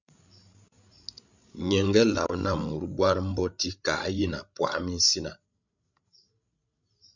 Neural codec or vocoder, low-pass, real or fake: codec, 16 kHz, 16 kbps, FreqCodec, larger model; 7.2 kHz; fake